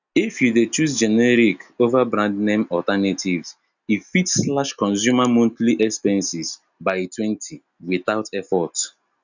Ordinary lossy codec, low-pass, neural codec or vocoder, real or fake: none; 7.2 kHz; none; real